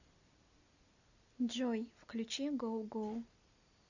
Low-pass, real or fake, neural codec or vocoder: 7.2 kHz; real; none